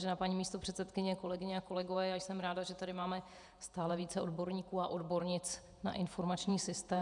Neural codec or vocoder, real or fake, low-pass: vocoder, 48 kHz, 128 mel bands, Vocos; fake; 10.8 kHz